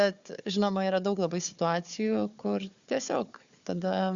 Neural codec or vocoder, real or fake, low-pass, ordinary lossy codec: codec, 16 kHz, 4 kbps, FunCodec, trained on Chinese and English, 50 frames a second; fake; 7.2 kHz; Opus, 64 kbps